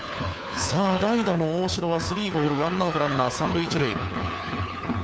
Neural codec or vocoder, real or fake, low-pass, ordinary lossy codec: codec, 16 kHz, 4 kbps, FunCodec, trained on LibriTTS, 50 frames a second; fake; none; none